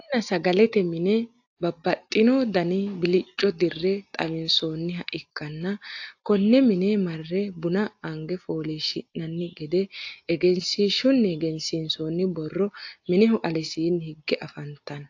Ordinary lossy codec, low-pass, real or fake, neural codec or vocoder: AAC, 48 kbps; 7.2 kHz; real; none